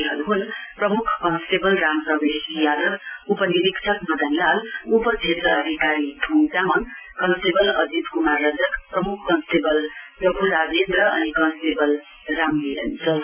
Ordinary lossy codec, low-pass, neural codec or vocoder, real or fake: none; 3.6 kHz; none; real